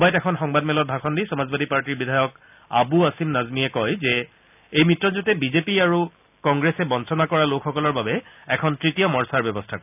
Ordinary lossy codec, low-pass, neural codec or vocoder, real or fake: none; 3.6 kHz; none; real